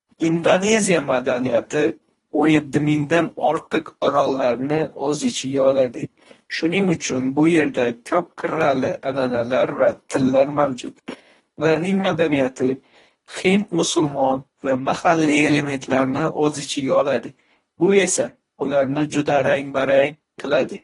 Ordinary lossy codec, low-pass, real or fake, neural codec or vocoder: AAC, 32 kbps; 10.8 kHz; fake; codec, 24 kHz, 1.5 kbps, HILCodec